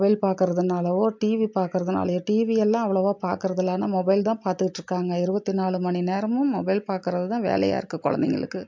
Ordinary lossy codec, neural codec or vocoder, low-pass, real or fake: MP3, 64 kbps; none; 7.2 kHz; real